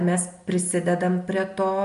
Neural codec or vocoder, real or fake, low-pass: none; real; 10.8 kHz